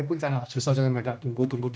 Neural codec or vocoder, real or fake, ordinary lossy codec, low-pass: codec, 16 kHz, 1 kbps, X-Codec, HuBERT features, trained on general audio; fake; none; none